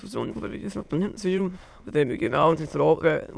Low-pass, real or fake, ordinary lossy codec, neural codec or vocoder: none; fake; none; autoencoder, 22.05 kHz, a latent of 192 numbers a frame, VITS, trained on many speakers